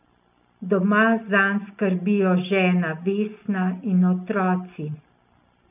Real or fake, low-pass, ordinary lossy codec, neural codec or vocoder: real; 3.6 kHz; none; none